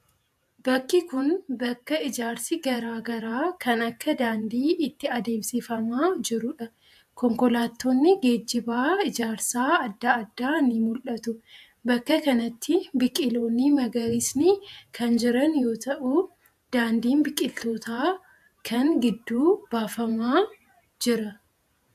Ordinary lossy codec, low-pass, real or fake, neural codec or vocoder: AAC, 96 kbps; 14.4 kHz; fake; vocoder, 48 kHz, 128 mel bands, Vocos